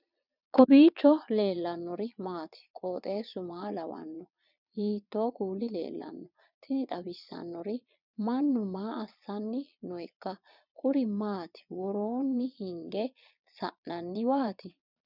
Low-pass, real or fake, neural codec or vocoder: 5.4 kHz; fake; vocoder, 22.05 kHz, 80 mel bands, Vocos